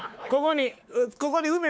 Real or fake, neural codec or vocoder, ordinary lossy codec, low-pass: fake; codec, 16 kHz, 4 kbps, X-Codec, WavLM features, trained on Multilingual LibriSpeech; none; none